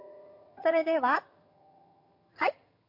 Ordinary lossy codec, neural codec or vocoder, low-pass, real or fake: MP3, 32 kbps; vocoder, 22.05 kHz, 80 mel bands, HiFi-GAN; 5.4 kHz; fake